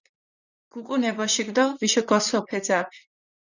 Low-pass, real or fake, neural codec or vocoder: 7.2 kHz; fake; vocoder, 44.1 kHz, 128 mel bands, Pupu-Vocoder